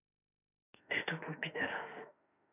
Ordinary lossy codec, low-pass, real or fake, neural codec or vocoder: none; 3.6 kHz; fake; autoencoder, 48 kHz, 32 numbers a frame, DAC-VAE, trained on Japanese speech